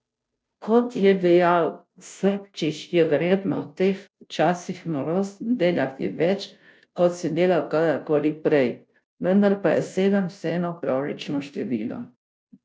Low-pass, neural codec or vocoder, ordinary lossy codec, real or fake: none; codec, 16 kHz, 0.5 kbps, FunCodec, trained on Chinese and English, 25 frames a second; none; fake